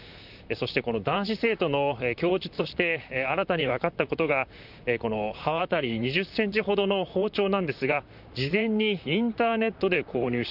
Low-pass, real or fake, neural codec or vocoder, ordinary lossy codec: 5.4 kHz; fake; vocoder, 44.1 kHz, 128 mel bands, Pupu-Vocoder; none